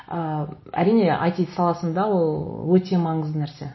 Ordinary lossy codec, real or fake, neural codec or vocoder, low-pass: MP3, 24 kbps; real; none; 7.2 kHz